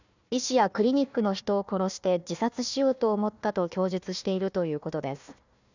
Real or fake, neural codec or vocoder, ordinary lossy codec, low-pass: fake; codec, 16 kHz, 1 kbps, FunCodec, trained on Chinese and English, 50 frames a second; none; 7.2 kHz